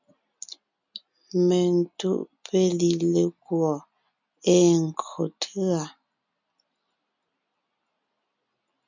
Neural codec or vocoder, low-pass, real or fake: none; 7.2 kHz; real